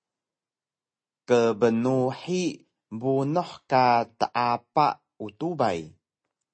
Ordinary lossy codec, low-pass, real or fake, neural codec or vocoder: MP3, 32 kbps; 9.9 kHz; real; none